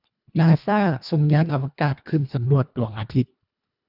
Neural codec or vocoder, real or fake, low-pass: codec, 24 kHz, 1.5 kbps, HILCodec; fake; 5.4 kHz